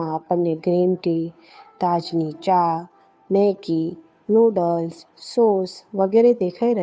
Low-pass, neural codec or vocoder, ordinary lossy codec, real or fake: none; codec, 16 kHz, 2 kbps, FunCodec, trained on Chinese and English, 25 frames a second; none; fake